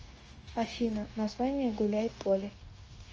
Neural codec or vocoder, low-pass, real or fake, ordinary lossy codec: codec, 16 kHz, 0.9 kbps, LongCat-Audio-Codec; 7.2 kHz; fake; Opus, 24 kbps